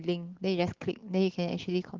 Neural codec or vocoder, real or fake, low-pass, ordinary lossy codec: none; real; 7.2 kHz; Opus, 16 kbps